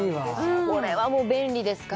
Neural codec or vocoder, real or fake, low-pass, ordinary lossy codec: none; real; none; none